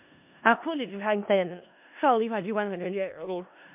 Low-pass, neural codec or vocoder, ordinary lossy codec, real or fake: 3.6 kHz; codec, 16 kHz in and 24 kHz out, 0.4 kbps, LongCat-Audio-Codec, four codebook decoder; MP3, 32 kbps; fake